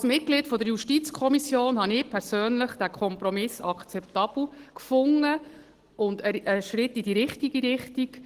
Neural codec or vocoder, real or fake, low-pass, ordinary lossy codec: none; real; 14.4 kHz; Opus, 16 kbps